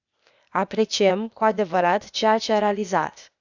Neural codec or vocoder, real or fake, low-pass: codec, 16 kHz, 0.8 kbps, ZipCodec; fake; 7.2 kHz